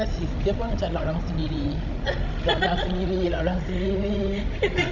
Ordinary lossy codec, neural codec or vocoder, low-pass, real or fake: none; codec, 16 kHz, 16 kbps, FreqCodec, larger model; 7.2 kHz; fake